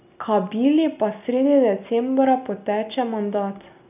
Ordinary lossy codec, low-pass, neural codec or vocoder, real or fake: none; 3.6 kHz; none; real